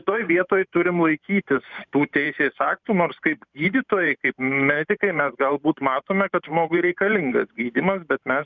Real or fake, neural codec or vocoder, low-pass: fake; vocoder, 44.1 kHz, 128 mel bands every 512 samples, BigVGAN v2; 7.2 kHz